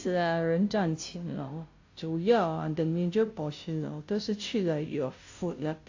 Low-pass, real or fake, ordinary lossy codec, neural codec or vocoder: 7.2 kHz; fake; AAC, 48 kbps; codec, 16 kHz, 0.5 kbps, FunCodec, trained on Chinese and English, 25 frames a second